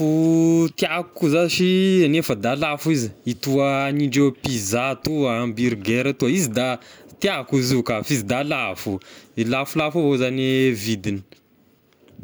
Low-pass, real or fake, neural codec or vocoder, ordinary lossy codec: none; real; none; none